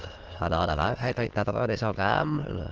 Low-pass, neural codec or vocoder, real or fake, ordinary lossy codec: 7.2 kHz; autoencoder, 22.05 kHz, a latent of 192 numbers a frame, VITS, trained on many speakers; fake; Opus, 16 kbps